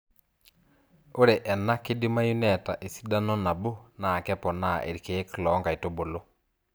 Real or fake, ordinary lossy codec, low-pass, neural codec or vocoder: real; none; none; none